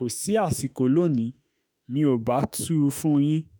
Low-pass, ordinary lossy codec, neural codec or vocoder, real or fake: none; none; autoencoder, 48 kHz, 32 numbers a frame, DAC-VAE, trained on Japanese speech; fake